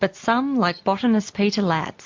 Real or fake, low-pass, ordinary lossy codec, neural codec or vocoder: real; 7.2 kHz; MP3, 48 kbps; none